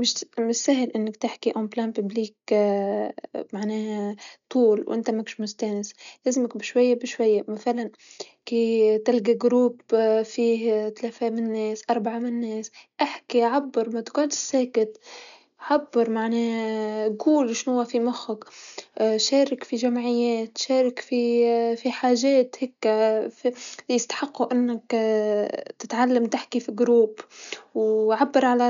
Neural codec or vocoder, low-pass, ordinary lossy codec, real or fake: none; 7.2 kHz; none; real